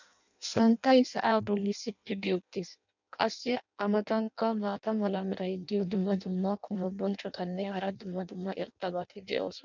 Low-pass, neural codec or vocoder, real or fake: 7.2 kHz; codec, 16 kHz in and 24 kHz out, 0.6 kbps, FireRedTTS-2 codec; fake